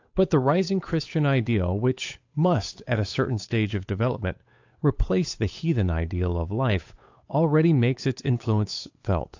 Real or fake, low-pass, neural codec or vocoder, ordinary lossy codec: fake; 7.2 kHz; codec, 16 kHz, 8 kbps, FunCodec, trained on Chinese and English, 25 frames a second; AAC, 48 kbps